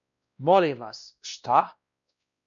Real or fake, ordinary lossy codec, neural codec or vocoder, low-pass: fake; MP3, 96 kbps; codec, 16 kHz, 1 kbps, X-Codec, WavLM features, trained on Multilingual LibriSpeech; 7.2 kHz